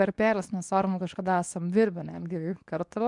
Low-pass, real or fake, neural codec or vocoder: 10.8 kHz; fake; codec, 24 kHz, 0.9 kbps, WavTokenizer, medium speech release version 2